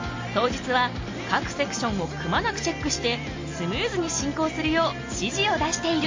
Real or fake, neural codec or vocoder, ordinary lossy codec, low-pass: real; none; MP3, 32 kbps; 7.2 kHz